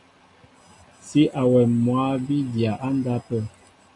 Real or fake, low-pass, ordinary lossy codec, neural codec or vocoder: real; 10.8 kHz; AAC, 48 kbps; none